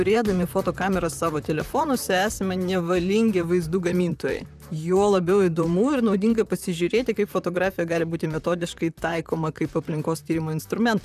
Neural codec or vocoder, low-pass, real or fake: vocoder, 44.1 kHz, 128 mel bands, Pupu-Vocoder; 14.4 kHz; fake